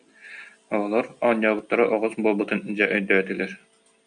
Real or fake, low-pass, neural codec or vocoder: real; 9.9 kHz; none